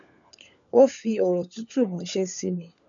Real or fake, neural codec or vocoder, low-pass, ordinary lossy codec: fake; codec, 16 kHz, 4 kbps, FunCodec, trained on LibriTTS, 50 frames a second; 7.2 kHz; none